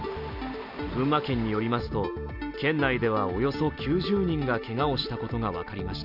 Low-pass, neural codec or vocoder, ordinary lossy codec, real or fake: 5.4 kHz; none; none; real